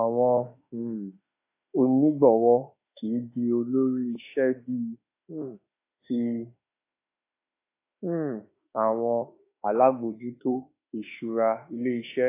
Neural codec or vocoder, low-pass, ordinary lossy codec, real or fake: autoencoder, 48 kHz, 32 numbers a frame, DAC-VAE, trained on Japanese speech; 3.6 kHz; AAC, 24 kbps; fake